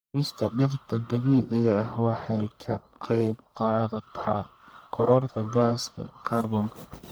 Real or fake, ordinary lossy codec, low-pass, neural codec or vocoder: fake; none; none; codec, 44.1 kHz, 1.7 kbps, Pupu-Codec